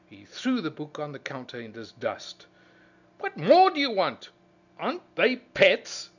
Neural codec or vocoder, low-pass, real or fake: none; 7.2 kHz; real